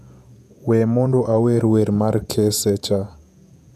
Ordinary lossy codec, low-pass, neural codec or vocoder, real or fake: none; 14.4 kHz; vocoder, 48 kHz, 128 mel bands, Vocos; fake